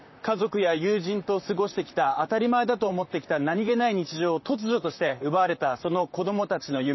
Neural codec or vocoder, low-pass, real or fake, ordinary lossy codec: vocoder, 44.1 kHz, 128 mel bands, Pupu-Vocoder; 7.2 kHz; fake; MP3, 24 kbps